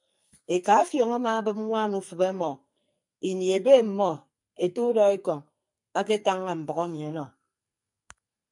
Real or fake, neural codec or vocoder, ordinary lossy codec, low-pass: fake; codec, 44.1 kHz, 2.6 kbps, SNAC; MP3, 96 kbps; 10.8 kHz